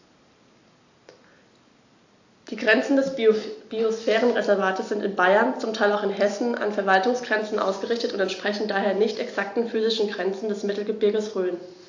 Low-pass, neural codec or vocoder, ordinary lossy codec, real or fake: 7.2 kHz; none; AAC, 48 kbps; real